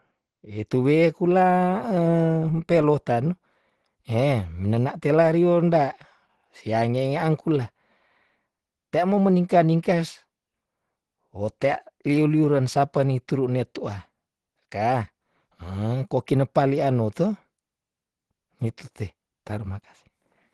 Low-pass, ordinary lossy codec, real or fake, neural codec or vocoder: 10.8 kHz; Opus, 16 kbps; real; none